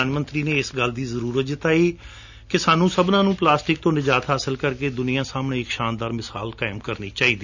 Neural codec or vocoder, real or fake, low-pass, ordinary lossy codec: none; real; 7.2 kHz; none